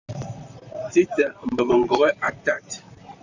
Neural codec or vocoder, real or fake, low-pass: vocoder, 44.1 kHz, 128 mel bands, Pupu-Vocoder; fake; 7.2 kHz